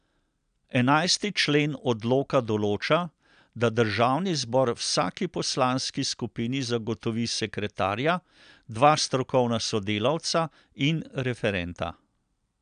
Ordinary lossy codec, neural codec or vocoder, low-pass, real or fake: none; none; 9.9 kHz; real